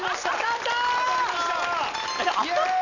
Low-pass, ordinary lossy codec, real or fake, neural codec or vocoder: 7.2 kHz; none; real; none